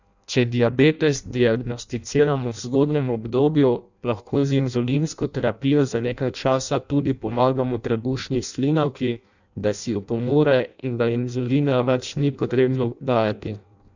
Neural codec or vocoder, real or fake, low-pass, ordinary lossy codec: codec, 16 kHz in and 24 kHz out, 0.6 kbps, FireRedTTS-2 codec; fake; 7.2 kHz; none